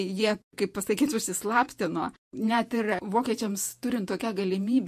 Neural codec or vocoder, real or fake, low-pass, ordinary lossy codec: vocoder, 48 kHz, 128 mel bands, Vocos; fake; 14.4 kHz; MP3, 64 kbps